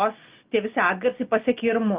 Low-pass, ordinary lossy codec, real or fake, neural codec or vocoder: 3.6 kHz; Opus, 32 kbps; real; none